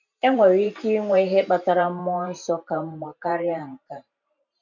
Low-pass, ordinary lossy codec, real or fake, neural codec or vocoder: 7.2 kHz; none; fake; vocoder, 44.1 kHz, 128 mel bands every 512 samples, BigVGAN v2